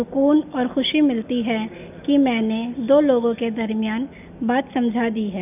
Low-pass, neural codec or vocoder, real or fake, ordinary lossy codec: 3.6 kHz; none; real; none